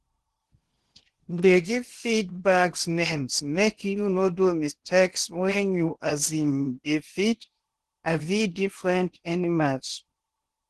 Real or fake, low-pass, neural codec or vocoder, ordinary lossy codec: fake; 10.8 kHz; codec, 16 kHz in and 24 kHz out, 0.8 kbps, FocalCodec, streaming, 65536 codes; Opus, 16 kbps